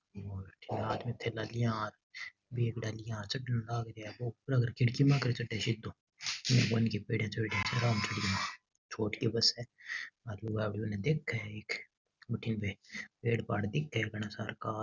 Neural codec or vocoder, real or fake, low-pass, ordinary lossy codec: none; real; 7.2 kHz; none